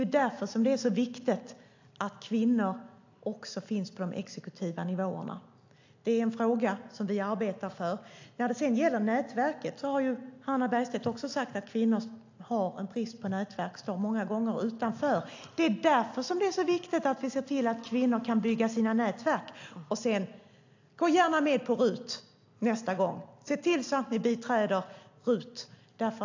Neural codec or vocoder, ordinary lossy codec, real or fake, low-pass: none; AAC, 48 kbps; real; 7.2 kHz